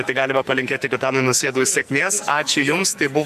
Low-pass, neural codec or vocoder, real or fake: 14.4 kHz; codec, 44.1 kHz, 2.6 kbps, SNAC; fake